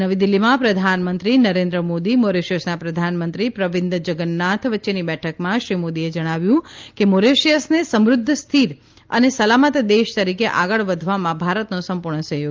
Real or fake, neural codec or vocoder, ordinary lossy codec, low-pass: real; none; Opus, 24 kbps; 7.2 kHz